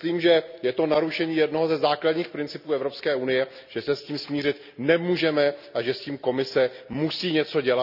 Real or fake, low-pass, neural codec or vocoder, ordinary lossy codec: real; 5.4 kHz; none; none